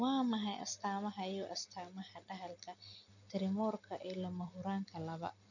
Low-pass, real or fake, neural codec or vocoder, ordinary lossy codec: 7.2 kHz; real; none; none